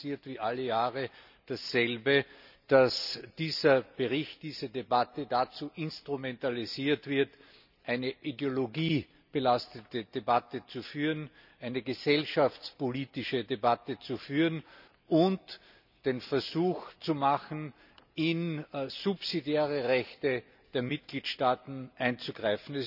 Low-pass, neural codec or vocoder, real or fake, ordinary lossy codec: 5.4 kHz; none; real; none